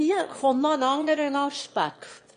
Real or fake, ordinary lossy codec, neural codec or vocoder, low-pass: fake; MP3, 48 kbps; autoencoder, 22.05 kHz, a latent of 192 numbers a frame, VITS, trained on one speaker; 9.9 kHz